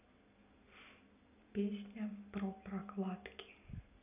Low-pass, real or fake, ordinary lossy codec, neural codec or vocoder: 3.6 kHz; real; none; none